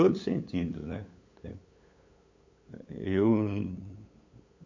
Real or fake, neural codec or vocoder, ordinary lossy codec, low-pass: fake; codec, 16 kHz, 8 kbps, FunCodec, trained on LibriTTS, 25 frames a second; MP3, 64 kbps; 7.2 kHz